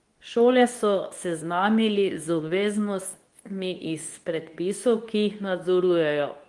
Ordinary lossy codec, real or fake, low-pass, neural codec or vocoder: Opus, 24 kbps; fake; 10.8 kHz; codec, 24 kHz, 0.9 kbps, WavTokenizer, medium speech release version 2